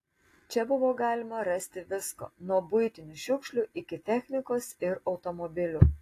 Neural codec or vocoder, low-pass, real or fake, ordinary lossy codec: none; 14.4 kHz; real; AAC, 48 kbps